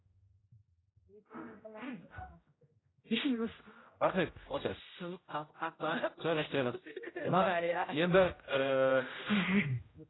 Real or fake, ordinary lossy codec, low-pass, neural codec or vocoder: fake; AAC, 16 kbps; 7.2 kHz; codec, 16 kHz, 0.5 kbps, X-Codec, HuBERT features, trained on general audio